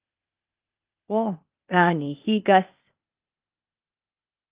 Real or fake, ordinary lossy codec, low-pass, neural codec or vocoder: fake; Opus, 24 kbps; 3.6 kHz; codec, 16 kHz, 0.8 kbps, ZipCodec